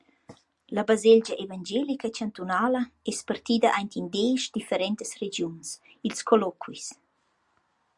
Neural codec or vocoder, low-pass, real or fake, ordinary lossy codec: none; 10.8 kHz; real; Opus, 64 kbps